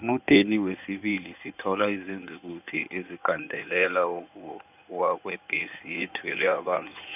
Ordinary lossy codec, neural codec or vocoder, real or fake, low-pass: none; codec, 16 kHz in and 24 kHz out, 2.2 kbps, FireRedTTS-2 codec; fake; 3.6 kHz